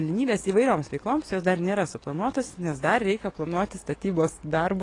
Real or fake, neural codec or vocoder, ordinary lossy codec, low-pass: fake; codec, 44.1 kHz, 7.8 kbps, DAC; AAC, 32 kbps; 10.8 kHz